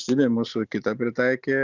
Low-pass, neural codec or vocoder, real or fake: 7.2 kHz; none; real